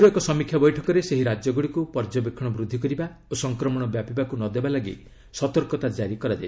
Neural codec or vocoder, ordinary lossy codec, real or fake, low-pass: none; none; real; none